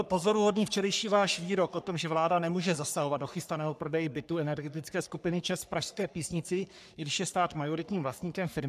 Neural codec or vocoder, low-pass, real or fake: codec, 44.1 kHz, 3.4 kbps, Pupu-Codec; 14.4 kHz; fake